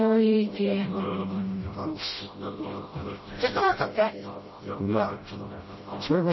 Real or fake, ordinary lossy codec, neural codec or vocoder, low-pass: fake; MP3, 24 kbps; codec, 16 kHz, 0.5 kbps, FreqCodec, smaller model; 7.2 kHz